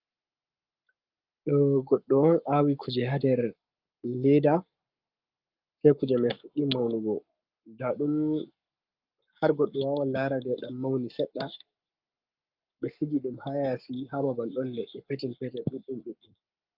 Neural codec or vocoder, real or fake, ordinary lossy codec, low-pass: codec, 44.1 kHz, 7.8 kbps, Pupu-Codec; fake; Opus, 24 kbps; 5.4 kHz